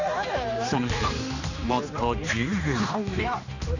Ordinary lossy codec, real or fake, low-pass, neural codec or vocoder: none; fake; 7.2 kHz; codec, 16 kHz, 2 kbps, X-Codec, HuBERT features, trained on general audio